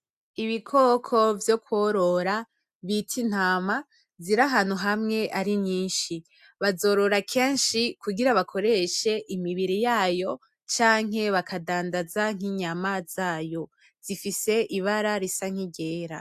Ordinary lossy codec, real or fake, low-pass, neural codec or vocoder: AAC, 96 kbps; real; 14.4 kHz; none